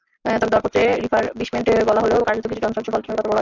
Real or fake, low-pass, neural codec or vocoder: real; 7.2 kHz; none